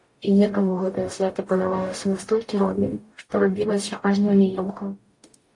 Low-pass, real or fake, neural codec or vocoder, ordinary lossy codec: 10.8 kHz; fake; codec, 44.1 kHz, 0.9 kbps, DAC; AAC, 48 kbps